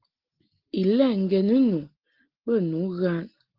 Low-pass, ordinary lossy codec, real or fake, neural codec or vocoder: 5.4 kHz; Opus, 16 kbps; real; none